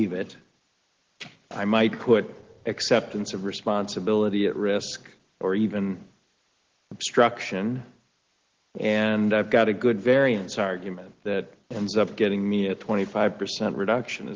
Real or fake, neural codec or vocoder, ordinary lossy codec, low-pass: real; none; Opus, 16 kbps; 7.2 kHz